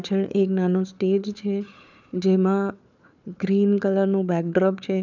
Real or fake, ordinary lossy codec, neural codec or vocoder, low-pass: fake; none; codec, 16 kHz, 4 kbps, FunCodec, trained on Chinese and English, 50 frames a second; 7.2 kHz